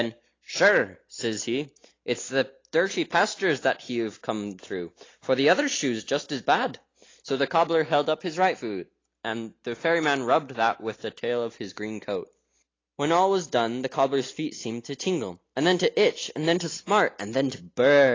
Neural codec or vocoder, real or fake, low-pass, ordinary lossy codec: none; real; 7.2 kHz; AAC, 32 kbps